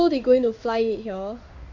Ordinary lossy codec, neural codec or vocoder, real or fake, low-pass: none; codec, 16 kHz in and 24 kHz out, 1 kbps, XY-Tokenizer; fake; 7.2 kHz